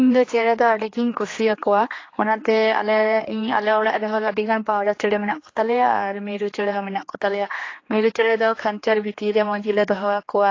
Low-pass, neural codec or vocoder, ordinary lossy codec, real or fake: 7.2 kHz; codec, 16 kHz, 2 kbps, X-Codec, HuBERT features, trained on general audio; AAC, 32 kbps; fake